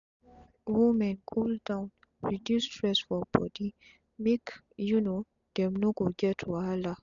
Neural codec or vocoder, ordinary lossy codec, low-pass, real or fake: none; none; 7.2 kHz; real